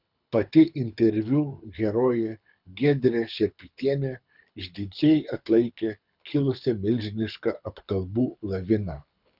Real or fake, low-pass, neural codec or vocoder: fake; 5.4 kHz; codec, 24 kHz, 6 kbps, HILCodec